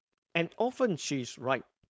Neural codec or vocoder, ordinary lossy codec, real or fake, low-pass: codec, 16 kHz, 4.8 kbps, FACodec; none; fake; none